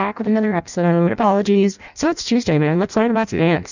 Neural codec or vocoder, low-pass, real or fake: codec, 16 kHz in and 24 kHz out, 0.6 kbps, FireRedTTS-2 codec; 7.2 kHz; fake